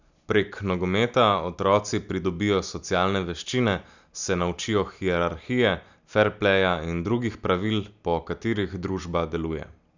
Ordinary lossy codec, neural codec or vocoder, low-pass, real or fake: none; none; 7.2 kHz; real